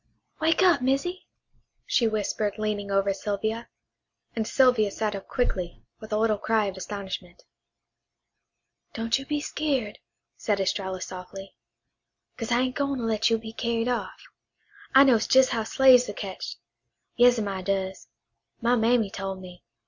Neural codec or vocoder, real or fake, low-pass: none; real; 7.2 kHz